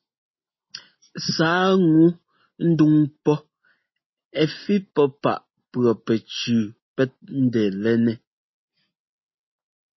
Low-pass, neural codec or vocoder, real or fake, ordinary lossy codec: 7.2 kHz; none; real; MP3, 24 kbps